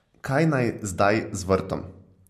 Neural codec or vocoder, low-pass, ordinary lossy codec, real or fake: none; 14.4 kHz; MP3, 64 kbps; real